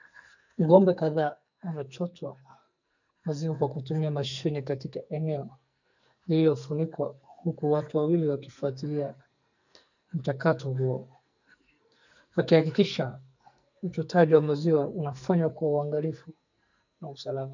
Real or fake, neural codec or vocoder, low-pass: fake; codec, 44.1 kHz, 2.6 kbps, SNAC; 7.2 kHz